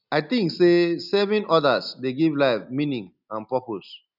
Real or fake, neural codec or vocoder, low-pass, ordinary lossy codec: real; none; 5.4 kHz; none